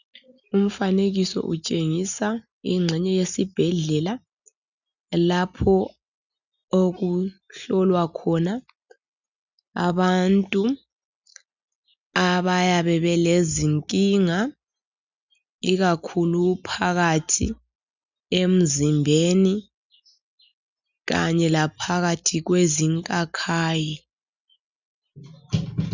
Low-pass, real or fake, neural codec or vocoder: 7.2 kHz; real; none